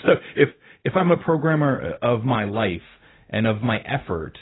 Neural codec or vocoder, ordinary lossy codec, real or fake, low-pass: codec, 16 kHz, 0.4 kbps, LongCat-Audio-Codec; AAC, 16 kbps; fake; 7.2 kHz